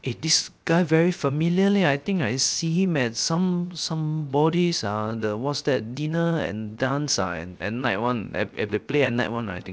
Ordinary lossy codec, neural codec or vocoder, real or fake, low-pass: none; codec, 16 kHz, 0.7 kbps, FocalCodec; fake; none